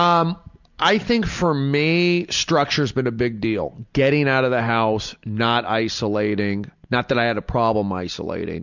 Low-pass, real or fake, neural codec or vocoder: 7.2 kHz; real; none